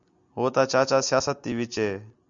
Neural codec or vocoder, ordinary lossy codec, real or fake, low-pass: none; MP3, 96 kbps; real; 7.2 kHz